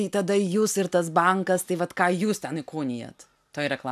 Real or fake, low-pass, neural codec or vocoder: real; 14.4 kHz; none